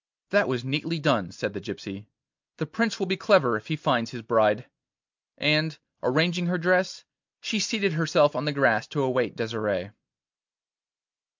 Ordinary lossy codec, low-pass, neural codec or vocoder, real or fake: MP3, 64 kbps; 7.2 kHz; none; real